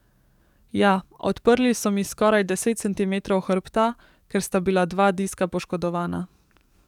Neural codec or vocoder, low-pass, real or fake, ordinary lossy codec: codec, 44.1 kHz, 7.8 kbps, DAC; 19.8 kHz; fake; none